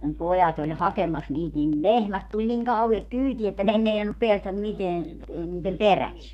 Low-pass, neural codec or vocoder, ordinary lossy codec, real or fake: 14.4 kHz; codec, 32 kHz, 1.9 kbps, SNAC; none; fake